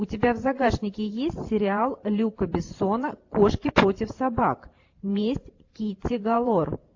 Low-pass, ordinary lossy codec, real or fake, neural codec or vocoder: 7.2 kHz; AAC, 48 kbps; fake; vocoder, 44.1 kHz, 128 mel bands every 512 samples, BigVGAN v2